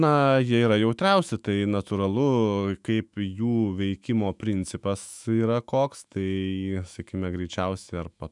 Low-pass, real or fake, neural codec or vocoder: 10.8 kHz; fake; autoencoder, 48 kHz, 128 numbers a frame, DAC-VAE, trained on Japanese speech